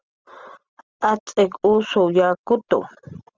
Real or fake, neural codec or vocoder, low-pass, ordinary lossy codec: real; none; 7.2 kHz; Opus, 24 kbps